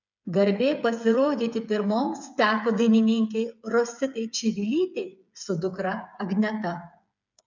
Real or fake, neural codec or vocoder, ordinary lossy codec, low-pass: fake; codec, 16 kHz, 8 kbps, FreqCodec, smaller model; MP3, 64 kbps; 7.2 kHz